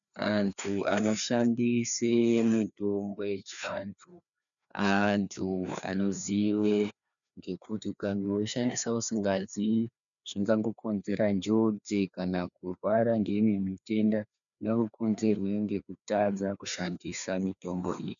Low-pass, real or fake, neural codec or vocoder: 7.2 kHz; fake; codec, 16 kHz, 2 kbps, FreqCodec, larger model